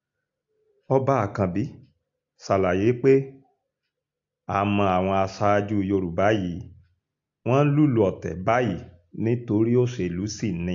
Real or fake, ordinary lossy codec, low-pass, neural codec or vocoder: real; AAC, 64 kbps; 7.2 kHz; none